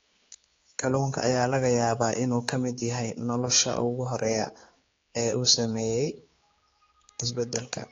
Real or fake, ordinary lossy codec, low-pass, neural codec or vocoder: fake; AAC, 32 kbps; 7.2 kHz; codec, 16 kHz, 4 kbps, X-Codec, HuBERT features, trained on general audio